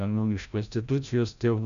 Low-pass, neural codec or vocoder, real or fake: 7.2 kHz; codec, 16 kHz, 0.5 kbps, FreqCodec, larger model; fake